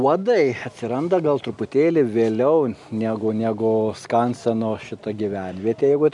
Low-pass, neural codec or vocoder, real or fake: 10.8 kHz; none; real